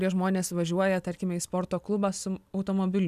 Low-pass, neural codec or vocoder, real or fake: 14.4 kHz; none; real